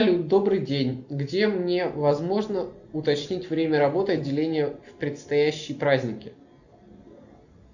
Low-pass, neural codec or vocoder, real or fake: 7.2 kHz; none; real